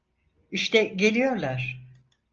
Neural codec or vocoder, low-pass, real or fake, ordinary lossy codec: none; 7.2 kHz; real; Opus, 24 kbps